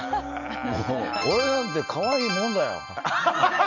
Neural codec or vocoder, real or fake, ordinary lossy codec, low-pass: none; real; none; 7.2 kHz